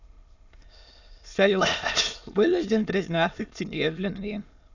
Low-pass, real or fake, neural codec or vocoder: 7.2 kHz; fake; autoencoder, 22.05 kHz, a latent of 192 numbers a frame, VITS, trained on many speakers